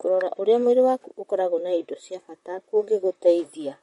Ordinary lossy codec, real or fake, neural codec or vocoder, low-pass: MP3, 48 kbps; fake; vocoder, 44.1 kHz, 128 mel bands, Pupu-Vocoder; 19.8 kHz